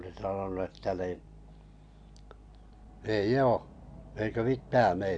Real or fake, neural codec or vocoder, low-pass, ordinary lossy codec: real; none; 9.9 kHz; AAC, 64 kbps